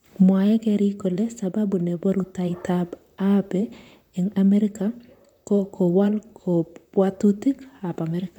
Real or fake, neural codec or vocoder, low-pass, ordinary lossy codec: fake; vocoder, 44.1 kHz, 128 mel bands, Pupu-Vocoder; 19.8 kHz; none